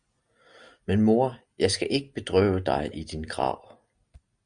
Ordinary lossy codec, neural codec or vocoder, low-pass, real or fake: Opus, 64 kbps; none; 9.9 kHz; real